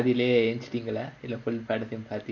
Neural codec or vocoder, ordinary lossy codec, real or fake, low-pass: none; none; real; 7.2 kHz